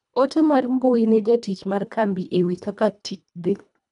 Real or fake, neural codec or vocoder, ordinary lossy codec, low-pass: fake; codec, 24 kHz, 1.5 kbps, HILCodec; none; 10.8 kHz